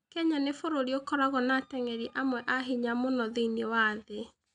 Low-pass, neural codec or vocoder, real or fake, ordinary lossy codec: 9.9 kHz; none; real; none